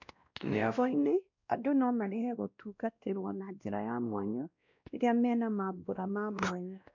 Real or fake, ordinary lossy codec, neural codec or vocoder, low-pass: fake; none; codec, 16 kHz, 1 kbps, X-Codec, WavLM features, trained on Multilingual LibriSpeech; 7.2 kHz